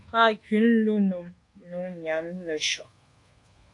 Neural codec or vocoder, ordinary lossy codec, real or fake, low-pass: codec, 24 kHz, 1.2 kbps, DualCodec; AAC, 48 kbps; fake; 10.8 kHz